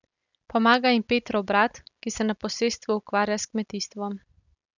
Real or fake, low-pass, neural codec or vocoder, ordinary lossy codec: real; 7.2 kHz; none; none